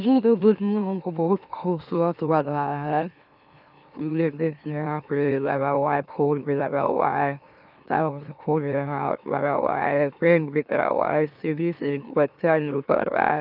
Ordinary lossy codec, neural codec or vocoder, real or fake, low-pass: none; autoencoder, 44.1 kHz, a latent of 192 numbers a frame, MeloTTS; fake; 5.4 kHz